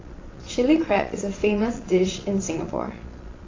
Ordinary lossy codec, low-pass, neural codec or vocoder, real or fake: AAC, 32 kbps; 7.2 kHz; vocoder, 22.05 kHz, 80 mel bands, Vocos; fake